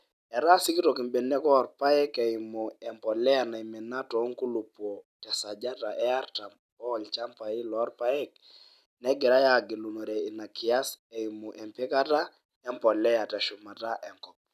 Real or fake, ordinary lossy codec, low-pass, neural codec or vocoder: real; none; 14.4 kHz; none